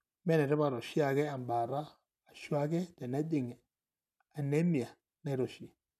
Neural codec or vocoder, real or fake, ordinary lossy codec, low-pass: none; real; none; 14.4 kHz